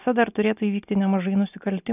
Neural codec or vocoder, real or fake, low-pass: none; real; 3.6 kHz